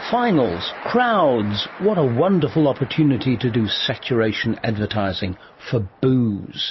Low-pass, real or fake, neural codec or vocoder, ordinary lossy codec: 7.2 kHz; real; none; MP3, 24 kbps